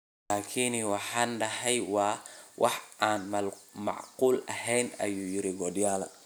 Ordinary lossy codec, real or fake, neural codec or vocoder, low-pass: none; real; none; none